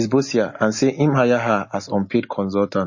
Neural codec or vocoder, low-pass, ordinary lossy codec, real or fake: none; 7.2 kHz; MP3, 32 kbps; real